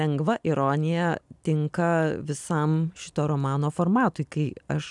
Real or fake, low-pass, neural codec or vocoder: real; 10.8 kHz; none